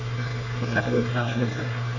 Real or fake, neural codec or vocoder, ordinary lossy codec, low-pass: fake; codec, 24 kHz, 1 kbps, SNAC; AAC, 48 kbps; 7.2 kHz